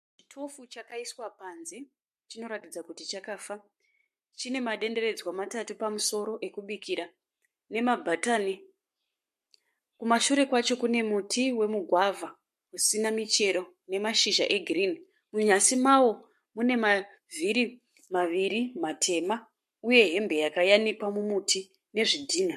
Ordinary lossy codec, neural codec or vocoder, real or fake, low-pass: MP3, 64 kbps; codec, 44.1 kHz, 7.8 kbps, Pupu-Codec; fake; 14.4 kHz